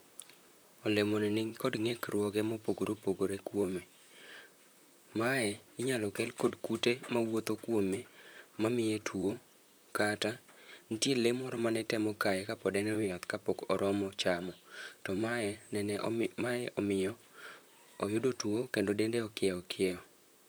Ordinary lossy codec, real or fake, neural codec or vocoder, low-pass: none; fake; vocoder, 44.1 kHz, 128 mel bands, Pupu-Vocoder; none